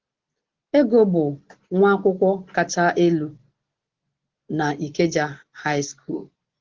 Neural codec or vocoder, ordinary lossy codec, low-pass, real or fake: none; Opus, 16 kbps; 7.2 kHz; real